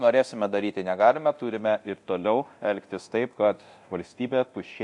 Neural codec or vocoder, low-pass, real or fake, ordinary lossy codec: codec, 24 kHz, 0.9 kbps, DualCodec; 10.8 kHz; fake; AAC, 64 kbps